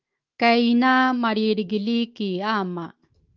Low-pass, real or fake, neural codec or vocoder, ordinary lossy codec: 7.2 kHz; fake; autoencoder, 48 kHz, 128 numbers a frame, DAC-VAE, trained on Japanese speech; Opus, 32 kbps